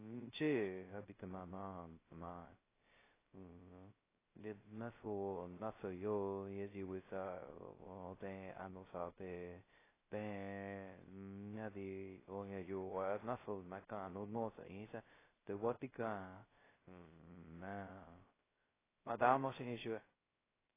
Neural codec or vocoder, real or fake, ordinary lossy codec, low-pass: codec, 16 kHz, 0.2 kbps, FocalCodec; fake; AAC, 16 kbps; 3.6 kHz